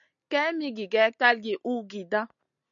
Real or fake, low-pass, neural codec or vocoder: real; 7.2 kHz; none